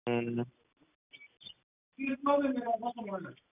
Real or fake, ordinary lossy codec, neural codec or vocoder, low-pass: real; none; none; 3.6 kHz